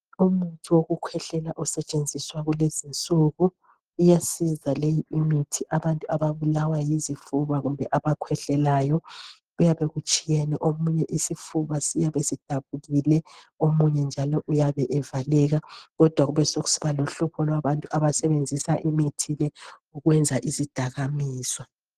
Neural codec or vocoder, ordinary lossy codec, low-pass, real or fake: none; Opus, 16 kbps; 14.4 kHz; real